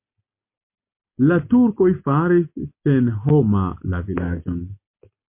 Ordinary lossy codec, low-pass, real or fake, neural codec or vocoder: MP3, 24 kbps; 3.6 kHz; real; none